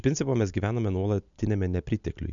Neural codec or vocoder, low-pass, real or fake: none; 7.2 kHz; real